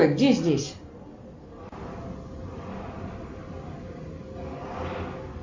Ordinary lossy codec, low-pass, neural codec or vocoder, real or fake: MP3, 48 kbps; 7.2 kHz; none; real